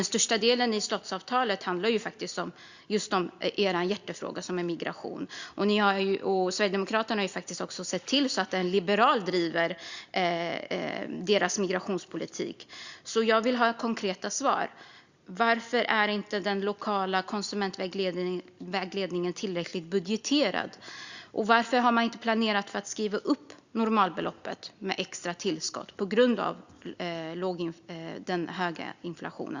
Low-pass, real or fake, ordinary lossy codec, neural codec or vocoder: 7.2 kHz; real; Opus, 64 kbps; none